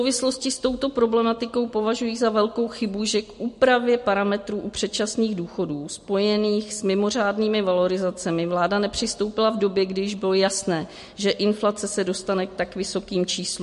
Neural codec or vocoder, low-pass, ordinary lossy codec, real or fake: none; 14.4 kHz; MP3, 48 kbps; real